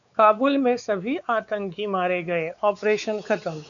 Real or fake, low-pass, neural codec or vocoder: fake; 7.2 kHz; codec, 16 kHz, 4 kbps, X-Codec, WavLM features, trained on Multilingual LibriSpeech